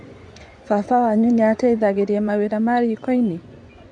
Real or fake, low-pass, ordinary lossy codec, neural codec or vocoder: fake; 9.9 kHz; none; vocoder, 24 kHz, 100 mel bands, Vocos